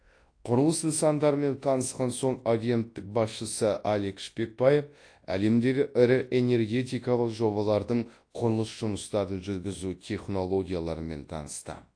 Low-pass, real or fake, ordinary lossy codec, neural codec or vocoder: 9.9 kHz; fake; AAC, 48 kbps; codec, 24 kHz, 0.9 kbps, WavTokenizer, large speech release